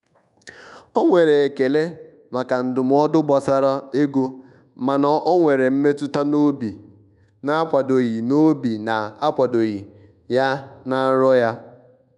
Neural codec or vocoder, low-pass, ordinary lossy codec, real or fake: codec, 24 kHz, 1.2 kbps, DualCodec; 10.8 kHz; none; fake